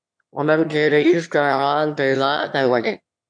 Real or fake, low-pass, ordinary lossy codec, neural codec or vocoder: fake; 9.9 kHz; MP3, 64 kbps; autoencoder, 22.05 kHz, a latent of 192 numbers a frame, VITS, trained on one speaker